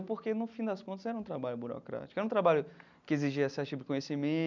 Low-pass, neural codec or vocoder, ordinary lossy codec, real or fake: 7.2 kHz; none; none; real